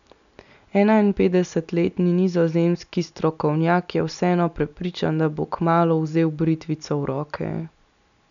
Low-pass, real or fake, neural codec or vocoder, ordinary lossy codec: 7.2 kHz; real; none; none